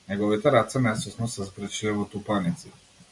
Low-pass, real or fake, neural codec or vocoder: 10.8 kHz; real; none